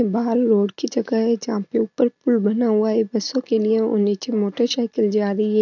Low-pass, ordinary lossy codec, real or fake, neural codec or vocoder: 7.2 kHz; none; real; none